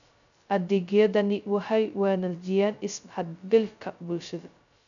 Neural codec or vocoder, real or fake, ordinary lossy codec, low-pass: codec, 16 kHz, 0.2 kbps, FocalCodec; fake; none; 7.2 kHz